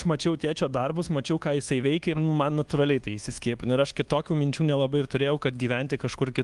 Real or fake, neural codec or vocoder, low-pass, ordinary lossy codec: fake; codec, 24 kHz, 1.2 kbps, DualCodec; 10.8 kHz; Opus, 24 kbps